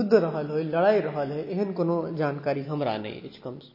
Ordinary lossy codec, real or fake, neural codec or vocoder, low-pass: MP3, 24 kbps; real; none; 5.4 kHz